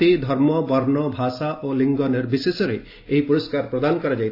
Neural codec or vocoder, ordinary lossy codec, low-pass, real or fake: none; AAC, 32 kbps; 5.4 kHz; real